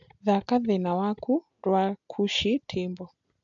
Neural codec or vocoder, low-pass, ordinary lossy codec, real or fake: none; 7.2 kHz; AAC, 64 kbps; real